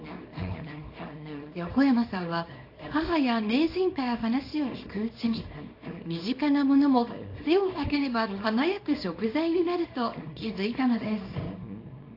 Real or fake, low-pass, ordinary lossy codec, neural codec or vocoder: fake; 5.4 kHz; AAC, 32 kbps; codec, 24 kHz, 0.9 kbps, WavTokenizer, small release